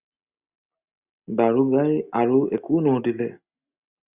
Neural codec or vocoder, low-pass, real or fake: none; 3.6 kHz; real